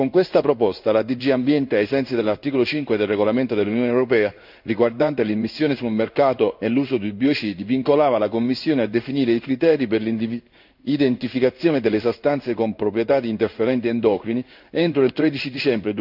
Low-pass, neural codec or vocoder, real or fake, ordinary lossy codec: 5.4 kHz; codec, 16 kHz in and 24 kHz out, 1 kbps, XY-Tokenizer; fake; none